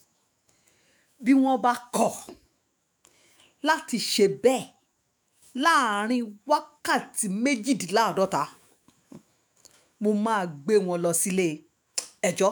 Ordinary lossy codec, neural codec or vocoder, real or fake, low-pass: none; autoencoder, 48 kHz, 128 numbers a frame, DAC-VAE, trained on Japanese speech; fake; none